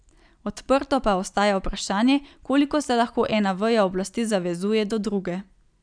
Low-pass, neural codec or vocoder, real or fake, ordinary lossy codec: 9.9 kHz; none; real; none